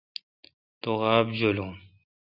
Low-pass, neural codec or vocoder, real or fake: 5.4 kHz; none; real